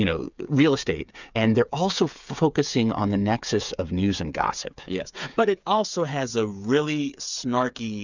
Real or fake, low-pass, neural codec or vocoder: fake; 7.2 kHz; codec, 16 kHz, 8 kbps, FreqCodec, smaller model